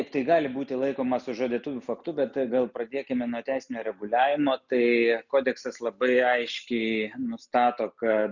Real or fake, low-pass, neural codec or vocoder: real; 7.2 kHz; none